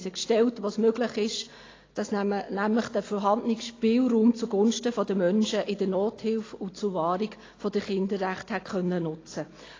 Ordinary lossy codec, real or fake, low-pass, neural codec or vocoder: AAC, 32 kbps; real; 7.2 kHz; none